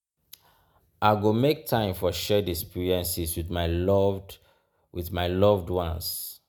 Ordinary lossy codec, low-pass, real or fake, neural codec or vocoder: none; none; real; none